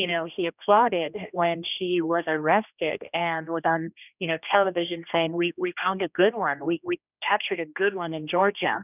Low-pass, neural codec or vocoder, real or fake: 3.6 kHz; codec, 16 kHz, 1 kbps, X-Codec, HuBERT features, trained on general audio; fake